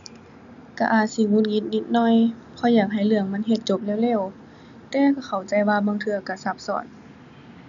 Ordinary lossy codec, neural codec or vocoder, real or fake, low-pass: none; none; real; 7.2 kHz